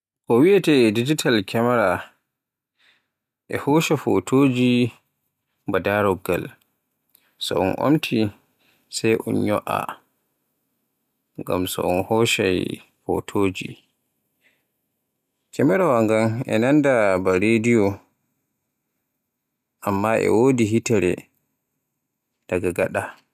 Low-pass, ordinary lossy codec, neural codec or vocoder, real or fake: 14.4 kHz; none; none; real